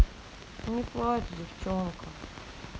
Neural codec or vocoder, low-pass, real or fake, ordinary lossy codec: none; none; real; none